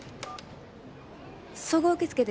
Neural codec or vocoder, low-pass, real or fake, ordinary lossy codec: none; none; real; none